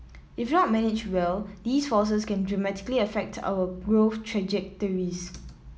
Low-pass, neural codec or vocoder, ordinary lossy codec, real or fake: none; none; none; real